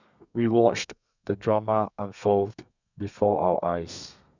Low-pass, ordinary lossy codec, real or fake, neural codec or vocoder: 7.2 kHz; none; fake; codec, 32 kHz, 1.9 kbps, SNAC